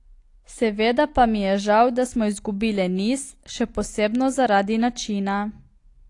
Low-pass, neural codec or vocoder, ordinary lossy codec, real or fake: 10.8 kHz; none; AAC, 48 kbps; real